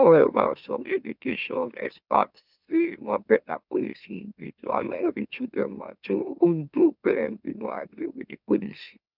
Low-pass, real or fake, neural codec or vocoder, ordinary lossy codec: 5.4 kHz; fake; autoencoder, 44.1 kHz, a latent of 192 numbers a frame, MeloTTS; none